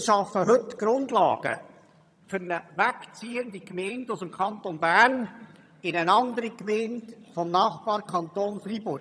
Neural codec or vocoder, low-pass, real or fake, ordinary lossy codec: vocoder, 22.05 kHz, 80 mel bands, HiFi-GAN; none; fake; none